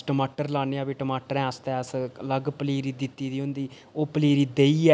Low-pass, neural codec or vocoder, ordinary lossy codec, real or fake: none; none; none; real